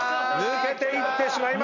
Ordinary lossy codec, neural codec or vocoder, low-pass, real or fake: none; none; 7.2 kHz; real